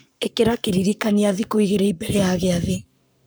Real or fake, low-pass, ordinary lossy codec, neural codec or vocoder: fake; none; none; codec, 44.1 kHz, 7.8 kbps, Pupu-Codec